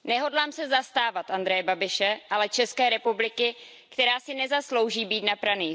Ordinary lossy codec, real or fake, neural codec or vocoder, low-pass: none; real; none; none